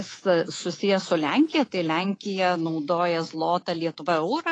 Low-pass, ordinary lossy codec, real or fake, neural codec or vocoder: 9.9 kHz; AAC, 32 kbps; fake; codec, 24 kHz, 3.1 kbps, DualCodec